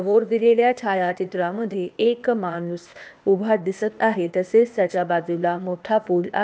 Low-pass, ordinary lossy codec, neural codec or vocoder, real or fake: none; none; codec, 16 kHz, 0.8 kbps, ZipCodec; fake